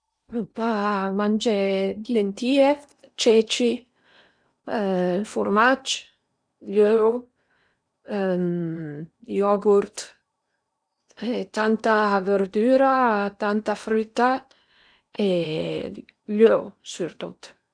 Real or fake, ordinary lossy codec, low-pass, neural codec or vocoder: fake; none; 9.9 kHz; codec, 16 kHz in and 24 kHz out, 0.8 kbps, FocalCodec, streaming, 65536 codes